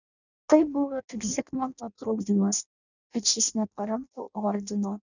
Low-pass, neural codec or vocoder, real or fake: 7.2 kHz; codec, 16 kHz in and 24 kHz out, 0.6 kbps, FireRedTTS-2 codec; fake